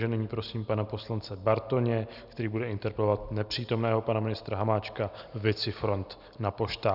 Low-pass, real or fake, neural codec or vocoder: 5.4 kHz; real; none